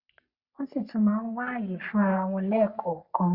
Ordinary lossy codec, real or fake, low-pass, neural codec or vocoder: Opus, 64 kbps; fake; 5.4 kHz; codec, 44.1 kHz, 3.4 kbps, Pupu-Codec